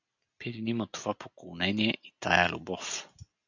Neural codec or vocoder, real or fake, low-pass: none; real; 7.2 kHz